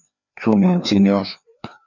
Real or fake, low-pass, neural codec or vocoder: fake; 7.2 kHz; codec, 16 kHz, 2 kbps, FreqCodec, larger model